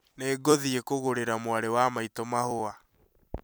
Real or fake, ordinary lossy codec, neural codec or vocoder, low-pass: fake; none; vocoder, 44.1 kHz, 128 mel bands every 256 samples, BigVGAN v2; none